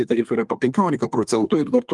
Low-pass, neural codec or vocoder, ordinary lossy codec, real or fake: 10.8 kHz; codec, 24 kHz, 1 kbps, SNAC; Opus, 32 kbps; fake